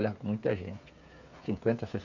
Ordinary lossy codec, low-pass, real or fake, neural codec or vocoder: none; 7.2 kHz; fake; codec, 16 kHz, 8 kbps, FreqCodec, smaller model